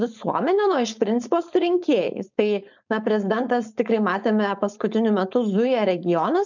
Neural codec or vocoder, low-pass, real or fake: codec, 16 kHz, 4.8 kbps, FACodec; 7.2 kHz; fake